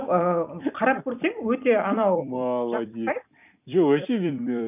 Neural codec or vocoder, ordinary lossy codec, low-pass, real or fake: vocoder, 44.1 kHz, 128 mel bands every 256 samples, BigVGAN v2; none; 3.6 kHz; fake